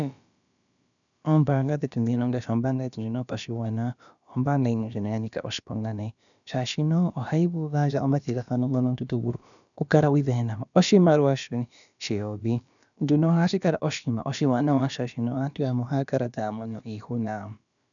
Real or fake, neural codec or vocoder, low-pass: fake; codec, 16 kHz, about 1 kbps, DyCAST, with the encoder's durations; 7.2 kHz